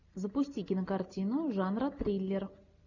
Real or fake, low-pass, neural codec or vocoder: real; 7.2 kHz; none